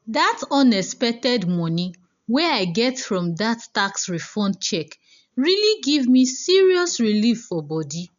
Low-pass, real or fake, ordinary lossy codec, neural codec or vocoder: 7.2 kHz; real; none; none